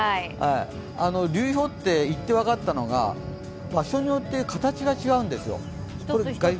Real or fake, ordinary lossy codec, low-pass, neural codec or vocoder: real; none; none; none